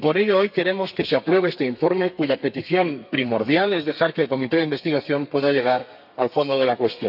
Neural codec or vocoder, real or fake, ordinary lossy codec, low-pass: codec, 32 kHz, 1.9 kbps, SNAC; fake; none; 5.4 kHz